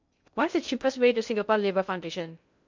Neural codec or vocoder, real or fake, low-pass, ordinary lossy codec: codec, 16 kHz in and 24 kHz out, 0.6 kbps, FocalCodec, streaming, 2048 codes; fake; 7.2 kHz; MP3, 64 kbps